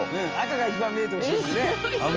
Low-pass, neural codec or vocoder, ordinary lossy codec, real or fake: 7.2 kHz; none; Opus, 24 kbps; real